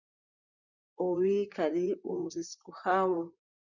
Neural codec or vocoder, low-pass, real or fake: vocoder, 44.1 kHz, 128 mel bands, Pupu-Vocoder; 7.2 kHz; fake